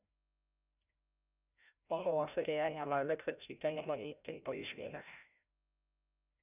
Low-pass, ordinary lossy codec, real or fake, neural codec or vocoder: 3.6 kHz; none; fake; codec, 16 kHz, 0.5 kbps, FreqCodec, larger model